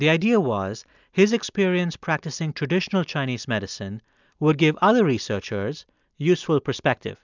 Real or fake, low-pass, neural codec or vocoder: real; 7.2 kHz; none